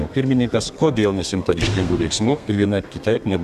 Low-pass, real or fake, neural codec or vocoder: 14.4 kHz; fake; codec, 32 kHz, 1.9 kbps, SNAC